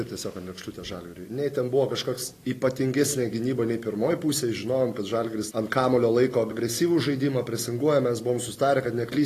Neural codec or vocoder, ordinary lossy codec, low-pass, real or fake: none; AAC, 48 kbps; 14.4 kHz; real